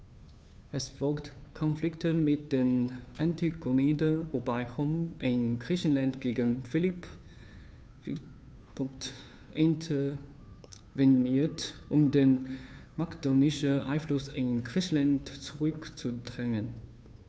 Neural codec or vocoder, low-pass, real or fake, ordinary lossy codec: codec, 16 kHz, 2 kbps, FunCodec, trained on Chinese and English, 25 frames a second; none; fake; none